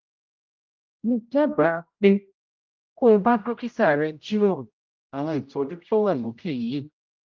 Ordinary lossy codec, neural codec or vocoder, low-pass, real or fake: Opus, 24 kbps; codec, 16 kHz, 0.5 kbps, X-Codec, HuBERT features, trained on general audio; 7.2 kHz; fake